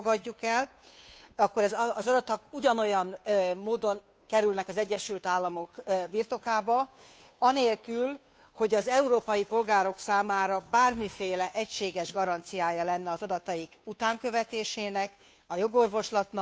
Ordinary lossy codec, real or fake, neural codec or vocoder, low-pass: none; fake; codec, 16 kHz, 2 kbps, FunCodec, trained on Chinese and English, 25 frames a second; none